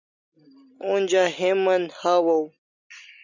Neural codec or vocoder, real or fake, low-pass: vocoder, 44.1 kHz, 128 mel bands every 256 samples, BigVGAN v2; fake; 7.2 kHz